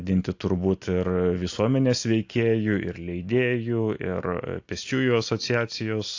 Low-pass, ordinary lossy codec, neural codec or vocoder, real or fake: 7.2 kHz; AAC, 48 kbps; none; real